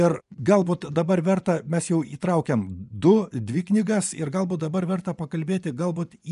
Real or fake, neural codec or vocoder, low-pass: fake; vocoder, 24 kHz, 100 mel bands, Vocos; 10.8 kHz